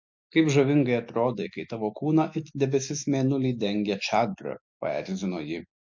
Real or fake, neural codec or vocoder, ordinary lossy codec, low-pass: real; none; MP3, 48 kbps; 7.2 kHz